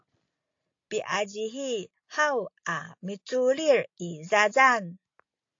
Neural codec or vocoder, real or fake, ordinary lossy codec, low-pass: none; real; AAC, 48 kbps; 7.2 kHz